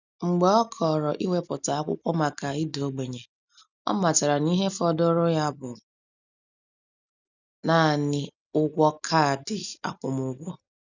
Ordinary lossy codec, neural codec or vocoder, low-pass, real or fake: none; none; 7.2 kHz; real